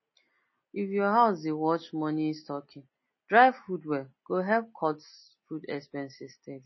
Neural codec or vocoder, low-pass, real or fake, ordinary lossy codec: none; 7.2 kHz; real; MP3, 24 kbps